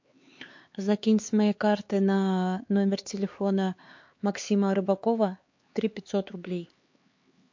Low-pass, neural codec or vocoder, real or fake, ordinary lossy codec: 7.2 kHz; codec, 16 kHz, 4 kbps, X-Codec, HuBERT features, trained on LibriSpeech; fake; MP3, 48 kbps